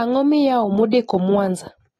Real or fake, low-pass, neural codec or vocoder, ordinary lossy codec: real; 19.8 kHz; none; AAC, 32 kbps